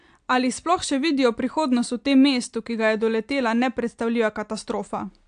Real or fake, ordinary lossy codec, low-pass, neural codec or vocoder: real; AAC, 64 kbps; 9.9 kHz; none